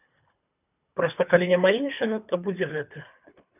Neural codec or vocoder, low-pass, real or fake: codec, 24 kHz, 3 kbps, HILCodec; 3.6 kHz; fake